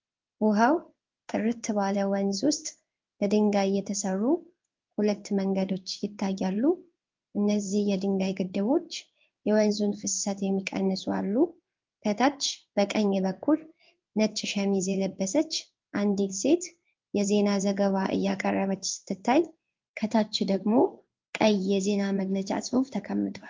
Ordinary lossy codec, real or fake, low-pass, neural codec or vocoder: Opus, 24 kbps; fake; 7.2 kHz; codec, 16 kHz in and 24 kHz out, 1 kbps, XY-Tokenizer